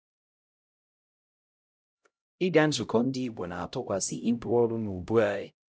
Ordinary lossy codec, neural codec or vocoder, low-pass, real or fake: none; codec, 16 kHz, 0.5 kbps, X-Codec, HuBERT features, trained on LibriSpeech; none; fake